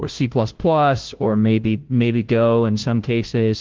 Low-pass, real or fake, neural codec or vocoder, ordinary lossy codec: 7.2 kHz; fake; codec, 16 kHz, 0.5 kbps, FunCodec, trained on Chinese and English, 25 frames a second; Opus, 16 kbps